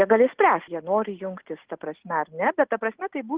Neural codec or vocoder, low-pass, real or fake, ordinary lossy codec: none; 3.6 kHz; real; Opus, 16 kbps